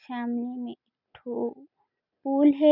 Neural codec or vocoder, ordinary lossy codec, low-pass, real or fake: none; none; 5.4 kHz; real